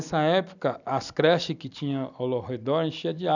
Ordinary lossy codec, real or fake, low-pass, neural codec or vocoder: none; real; 7.2 kHz; none